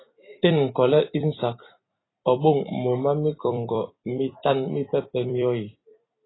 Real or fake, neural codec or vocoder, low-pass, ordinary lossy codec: fake; vocoder, 44.1 kHz, 128 mel bands every 256 samples, BigVGAN v2; 7.2 kHz; AAC, 16 kbps